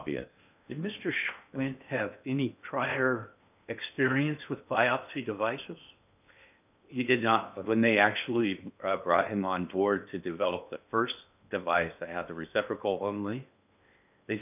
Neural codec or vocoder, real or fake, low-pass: codec, 16 kHz in and 24 kHz out, 0.8 kbps, FocalCodec, streaming, 65536 codes; fake; 3.6 kHz